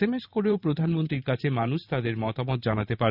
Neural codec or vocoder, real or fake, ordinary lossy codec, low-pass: vocoder, 44.1 kHz, 128 mel bands every 512 samples, BigVGAN v2; fake; none; 5.4 kHz